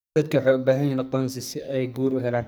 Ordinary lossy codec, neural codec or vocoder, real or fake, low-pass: none; codec, 44.1 kHz, 2.6 kbps, SNAC; fake; none